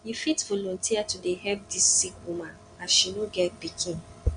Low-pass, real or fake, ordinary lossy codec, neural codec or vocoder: 9.9 kHz; real; none; none